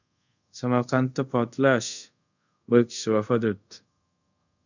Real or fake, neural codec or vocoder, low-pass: fake; codec, 24 kHz, 0.5 kbps, DualCodec; 7.2 kHz